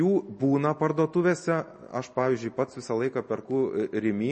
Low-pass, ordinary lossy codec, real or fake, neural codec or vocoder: 10.8 kHz; MP3, 32 kbps; real; none